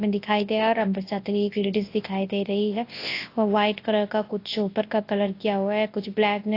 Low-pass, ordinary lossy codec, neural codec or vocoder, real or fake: 5.4 kHz; AAC, 32 kbps; codec, 24 kHz, 0.9 kbps, WavTokenizer, large speech release; fake